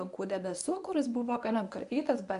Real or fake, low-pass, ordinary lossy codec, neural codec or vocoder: fake; 10.8 kHz; MP3, 96 kbps; codec, 24 kHz, 0.9 kbps, WavTokenizer, medium speech release version 1